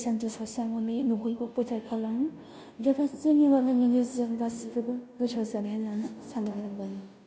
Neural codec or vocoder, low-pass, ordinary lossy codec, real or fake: codec, 16 kHz, 0.5 kbps, FunCodec, trained on Chinese and English, 25 frames a second; none; none; fake